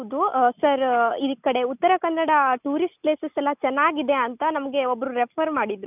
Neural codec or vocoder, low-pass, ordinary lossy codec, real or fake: none; 3.6 kHz; none; real